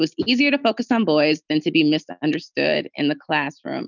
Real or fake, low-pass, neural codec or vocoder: real; 7.2 kHz; none